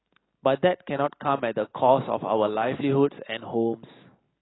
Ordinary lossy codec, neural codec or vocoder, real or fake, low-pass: AAC, 16 kbps; none; real; 7.2 kHz